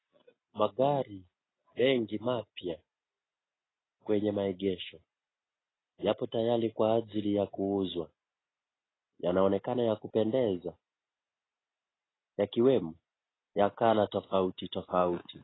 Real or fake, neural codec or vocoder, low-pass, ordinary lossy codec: real; none; 7.2 kHz; AAC, 16 kbps